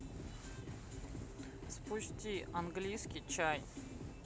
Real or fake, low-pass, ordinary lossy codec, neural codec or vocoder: real; none; none; none